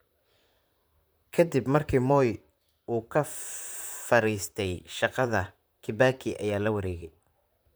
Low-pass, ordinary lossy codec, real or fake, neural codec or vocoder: none; none; fake; vocoder, 44.1 kHz, 128 mel bands, Pupu-Vocoder